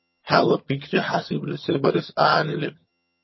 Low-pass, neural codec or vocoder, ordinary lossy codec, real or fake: 7.2 kHz; vocoder, 22.05 kHz, 80 mel bands, HiFi-GAN; MP3, 24 kbps; fake